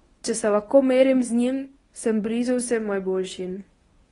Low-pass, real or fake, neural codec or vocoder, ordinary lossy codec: 10.8 kHz; fake; codec, 24 kHz, 0.9 kbps, WavTokenizer, medium speech release version 1; AAC, 32 kbps